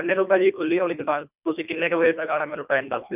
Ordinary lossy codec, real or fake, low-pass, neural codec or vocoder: none; fake; 3.6 kHz; codec, 24 kHz, 1.5 kbps, HILCodec